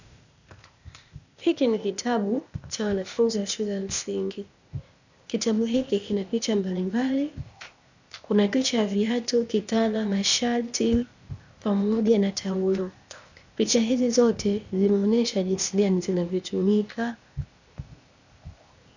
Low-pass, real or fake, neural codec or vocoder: 7.2 kHz; fake; codec, 16 kHz, 0.8 kbps, ZipCodec